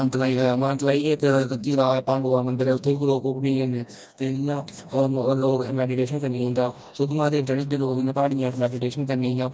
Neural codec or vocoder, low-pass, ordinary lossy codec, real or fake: codec, 16 kHz, 1 kbps, FreqCodec, smaller model; none; none; fake